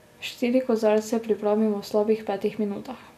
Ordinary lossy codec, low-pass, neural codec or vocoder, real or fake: none; 14.4 kHz; none; real